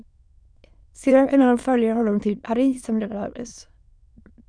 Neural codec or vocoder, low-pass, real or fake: autoencoder, 22.05 kHz, a latent of 192 numbers a frame, VITS, trained on many speakers; 9.9 kHz; fake